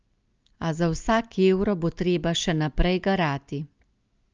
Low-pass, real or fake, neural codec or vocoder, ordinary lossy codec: 7.2 kHz; real; none; Opus, 24 kbps